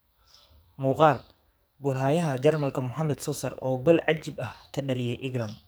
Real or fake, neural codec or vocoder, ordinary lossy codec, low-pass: fake; codec, 44.1 kHz, 2.6 kbps, SNAC; none; none